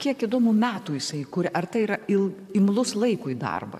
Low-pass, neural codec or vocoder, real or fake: 14.4 kHz; vocoder, 44.1 kHz, 128 mel bands, Pupu-Vocoder; fake